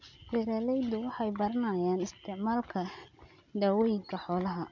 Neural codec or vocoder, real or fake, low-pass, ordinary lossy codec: none; real; 7.2 kHz; none